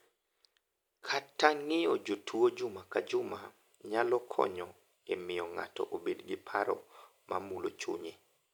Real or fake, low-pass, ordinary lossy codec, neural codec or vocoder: real; none; none; none